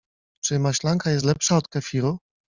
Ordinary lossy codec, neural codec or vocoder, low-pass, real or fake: Opus, 64 kbps; none; 7.2 kHz; real